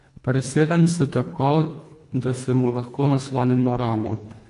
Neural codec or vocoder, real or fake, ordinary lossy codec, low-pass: codec, 24 kHz, 1.5 kbps, HILCodec; fake; AAC, 48 kbps; 10.8 kHz